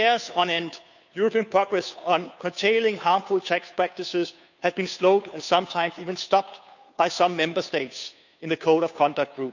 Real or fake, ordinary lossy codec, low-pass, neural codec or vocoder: fake; none; 7.2 kHz; codec, 16 kHz, 2 kbps, FunCodec, trained on Chinese and English, 25 frames a second